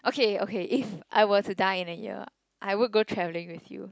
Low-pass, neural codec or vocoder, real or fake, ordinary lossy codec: none; none; real; none